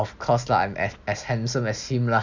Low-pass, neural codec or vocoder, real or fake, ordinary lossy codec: 7.2 kHz; none; real; none